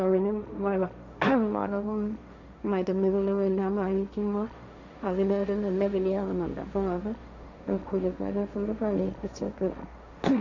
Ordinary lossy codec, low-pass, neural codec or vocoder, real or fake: none; 7.2 kHz; codec, 16 kHz, 1.1 kbps, Voila-Tokenizer; fake